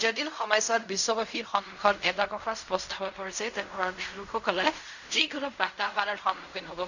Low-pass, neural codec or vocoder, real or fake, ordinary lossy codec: 7.2 kHz; codec, 16 kHz in and 24 kHz out, 0.4 kbps, LongCat-Audio-Codec, fine tuned four codebook decoder; fake; none